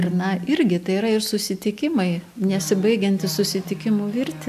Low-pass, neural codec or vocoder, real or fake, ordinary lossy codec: 14.4 kHz; none; real; AAC, 96 kbps